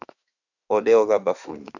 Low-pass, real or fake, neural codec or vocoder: 7.2 kHz; fake; autoencoder, 48 kHz, 32 numbers a frame, DAC-VAE, trained on Japanese speech